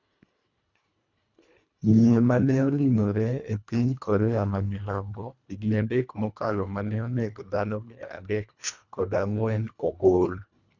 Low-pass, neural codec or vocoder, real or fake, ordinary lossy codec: 7.2 kHz; codec, 24 kHz, 1.5 kbps, HILCodec; fake; none